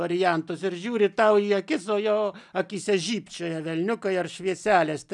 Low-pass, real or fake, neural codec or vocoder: 10.8 kHz; real; none